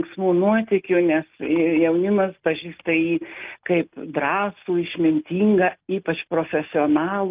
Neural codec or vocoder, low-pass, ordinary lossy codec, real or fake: none; 3.6 kHz; Opus, 24 kbps; real